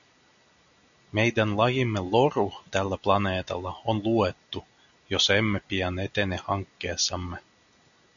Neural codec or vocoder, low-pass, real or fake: none; 7.2 kHz; real